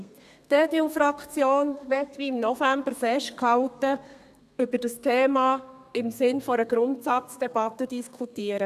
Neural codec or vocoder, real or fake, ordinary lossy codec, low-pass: codec, 32 kHz, 1.9 kbps, SNAC; fake; none; 14.4 kHz